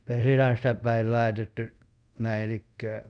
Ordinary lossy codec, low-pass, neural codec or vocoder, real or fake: none; 9.9 kHz; codec, 24 kHz, 0.5 kbps, DualCodec; fake